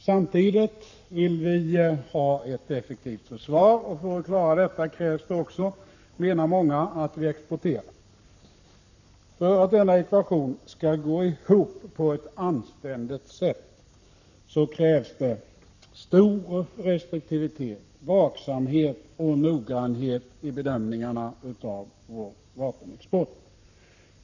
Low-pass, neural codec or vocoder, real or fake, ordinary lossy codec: 7.2 kHz; codec, 44.1 kHz, 7.8 kbps, Pupu-Codec; fake; none